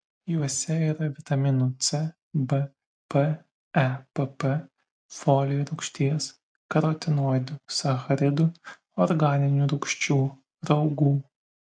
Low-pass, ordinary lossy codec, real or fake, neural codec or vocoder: 9.9 kHz; MP3, 64 kbps; real; none